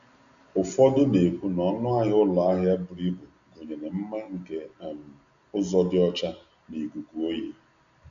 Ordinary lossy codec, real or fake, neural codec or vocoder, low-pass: none; real; none; 7.2 kHz